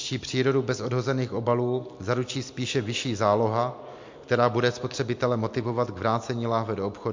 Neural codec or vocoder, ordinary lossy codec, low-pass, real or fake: none; MP3, 48 kbps; 7.2 kHz; real